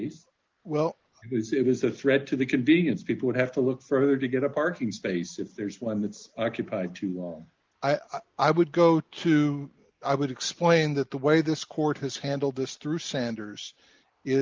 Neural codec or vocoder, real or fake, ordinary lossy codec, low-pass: none; real; Opus, 24 kbps; 7.2 kHz